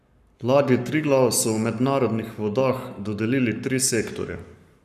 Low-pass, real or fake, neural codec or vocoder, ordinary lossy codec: 14.4 kHz; fake; codec, 44.1 kHz, 7.8 kbps, Pupu-Codec; none